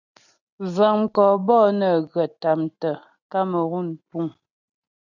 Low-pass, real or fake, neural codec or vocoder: 7.2 kHz; real; none